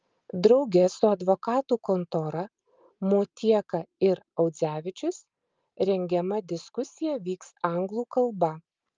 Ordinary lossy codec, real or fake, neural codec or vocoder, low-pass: Opus, 24 kbps; real; none; 7.2 kHz